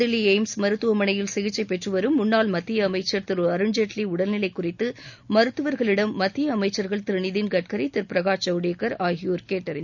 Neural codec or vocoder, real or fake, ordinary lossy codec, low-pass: none; real; none; 7.2 kHz